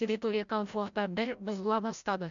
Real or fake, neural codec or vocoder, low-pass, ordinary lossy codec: fake; codec, 16 kHz, 0.5 kbps, FreqCodec, larger model; 7.2 kHz; MP3, 64 kbps